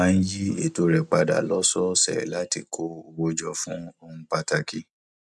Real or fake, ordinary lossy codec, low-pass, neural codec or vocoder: real; none; none; none